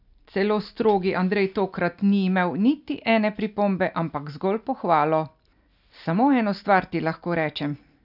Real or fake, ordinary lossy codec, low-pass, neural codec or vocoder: real; none; 5.4 kHz; none